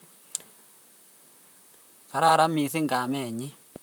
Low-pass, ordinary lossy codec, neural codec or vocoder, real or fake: none; none; vocoder, 44.1 kHz, 128 mel bands, Pupu-Vocoder; fake